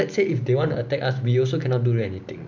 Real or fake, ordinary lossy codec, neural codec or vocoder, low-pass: real; none; none; 7.2 kHz